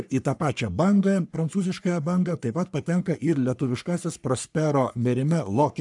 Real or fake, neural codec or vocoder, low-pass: fake; codec, 44.1 kHz, 3.4 kbps, Pupu-Codec; 10.8 kHz